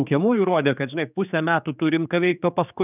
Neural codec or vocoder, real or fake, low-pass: codec, 16 kHz, 4 kbps, X-Codec, HuBERT features, trained on general audio; fake; 3.6 kHz